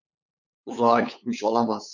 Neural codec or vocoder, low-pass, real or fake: codec, 16 kHz, 8 kbps, FunCodec, trained on LibriTTS, 25 frames a second; 7.2 kHz; fake